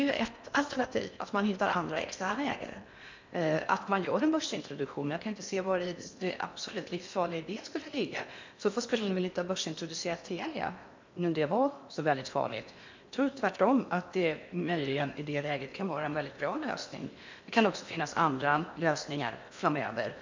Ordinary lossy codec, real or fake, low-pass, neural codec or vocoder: MP3, 64 kbps; fake; 7.2 kHz; codec, 16 kHz in and 24 kHz out, 0.8 kbps, FocalCodec, streaming, 65536 codes